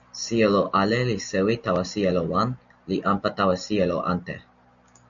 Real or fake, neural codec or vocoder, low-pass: real; none; 7.2 kHz